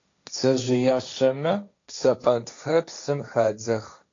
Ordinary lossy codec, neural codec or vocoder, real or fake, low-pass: AAC, 48 kbps; codec, 16 kHz, 1.1 kbps, Voila-Tokenizer; fake; 7.2 kHz